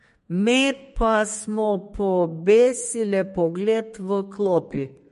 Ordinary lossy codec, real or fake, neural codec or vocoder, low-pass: MP3, 48 kbps; fake; codec, 32 kHz, 1.9 kbps, SNAC; 14.4 kHz